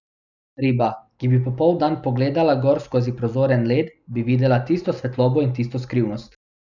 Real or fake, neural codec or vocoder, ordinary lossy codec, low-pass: real; none; none; 7.2 kHz